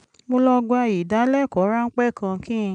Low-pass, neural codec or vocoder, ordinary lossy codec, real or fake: 9.9 kHz; none; none; real